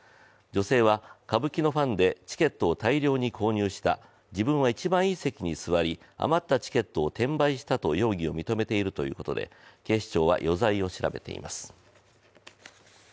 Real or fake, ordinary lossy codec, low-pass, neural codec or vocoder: real; none; none; none